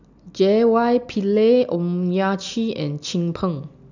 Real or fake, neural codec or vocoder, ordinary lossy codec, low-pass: real; none; none; 7.2 kHz